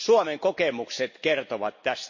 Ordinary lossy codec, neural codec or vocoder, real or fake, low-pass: MP3, 32 kbps; none; real; 7.2 kHz